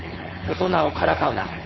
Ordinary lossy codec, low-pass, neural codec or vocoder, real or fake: MP3, 24 kbps; 7.2 kHz; codec, 16 kHz, 4.8 kbps, FACodec; fake